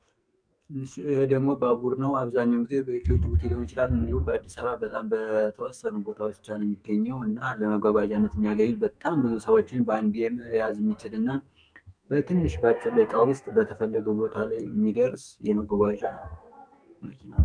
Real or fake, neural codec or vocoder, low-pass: fake; codec, 44.1 kHz, 2.6 kbps, SNAC; 9.9 kHz